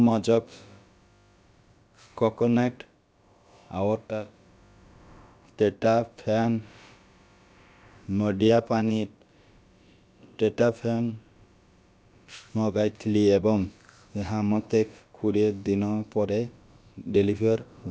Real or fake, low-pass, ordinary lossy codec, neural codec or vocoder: fake; none; none; codec, 16 kHz, about 1 kbps, DyCAST, with the encoder's durations